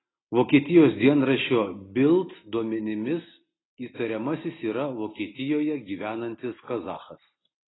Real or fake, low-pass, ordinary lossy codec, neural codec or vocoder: real; 7.2 kHz; AAC, 16 kbps; none